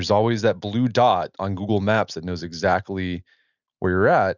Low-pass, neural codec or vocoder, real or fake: 7.2 kHz; none; real